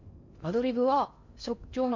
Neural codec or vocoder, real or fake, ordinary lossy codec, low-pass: codec, 16 kHz in and 24 kHz out, 0.6 kbps, FocalCodec, streaming, 4096 codes; fake; MP3, 48 kbps; 7.2 kHz